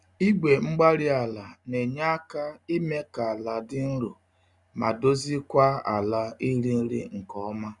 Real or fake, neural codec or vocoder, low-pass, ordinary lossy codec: real; none; 10.8 kHz; none